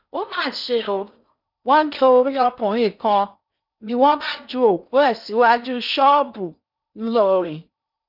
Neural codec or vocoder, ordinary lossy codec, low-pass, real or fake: codec, 16 kHz in and 24 kHz out, 0.6 kbps, FocalCodec, streaming, 4096 codes; none; 5.4 kHz; fake